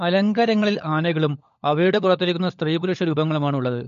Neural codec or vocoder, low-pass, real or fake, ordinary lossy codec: codec, 16 kHz, 16 kbps, FunCodec, trained on LibriTTS, 50 frames a second; 7.2 kHz; fake; MP3, 48 kbps